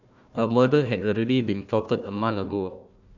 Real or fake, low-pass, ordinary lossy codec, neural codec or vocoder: fake; 7.2 kHz; none; codec, 16 kHz, 1 kbps, FunCodec, trained on Chinese and English, 50 frames a second